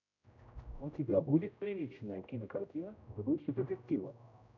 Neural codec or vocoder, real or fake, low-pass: codec, 16 kHz, 0.5 kbps, X-Codec, HuBERT features, trained on general audio; fake; 7.2 kHz